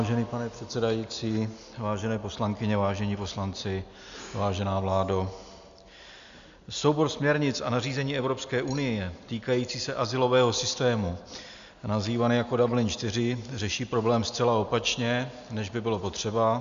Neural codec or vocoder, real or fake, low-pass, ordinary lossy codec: none; real; 7.2 kHz; Opus, 64 kbps